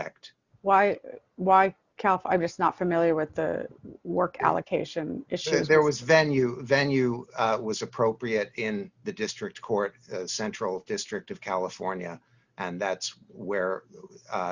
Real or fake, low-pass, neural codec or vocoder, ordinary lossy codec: real; 7.2 kHz; none; Opus, 64 kbps